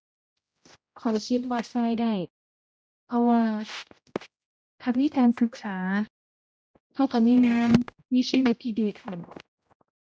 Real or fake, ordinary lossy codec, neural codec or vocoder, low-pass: fake; none; codec, 16 kHz, 0.5 kbps, X-Codec, HuBERT features, trained on general audio; none